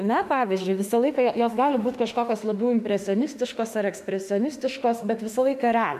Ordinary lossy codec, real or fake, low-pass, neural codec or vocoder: AAC, 96 kbps; fake; 14.4 kHz; autoencoder, 48 kHz, 32 numbers a frame, DAC-VAE, trained on Japanese speech